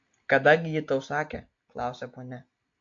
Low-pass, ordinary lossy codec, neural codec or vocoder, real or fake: 7.2 kHz; AAC, 48 kbps; none; real